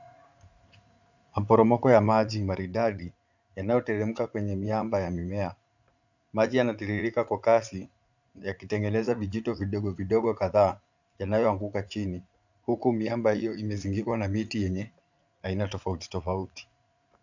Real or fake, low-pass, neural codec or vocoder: fake; 7.2 kHz; vocoder, 44.1 kHz, 80 mel bands, Vocos